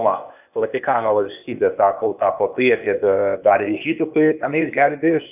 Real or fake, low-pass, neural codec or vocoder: fake; 3.6 kHz; codec, 16 kHz, 0.8 kbps, ZipCodec